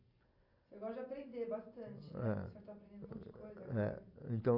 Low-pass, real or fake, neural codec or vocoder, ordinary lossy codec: 5.4 kHz; real; none; AAC, 32 kbps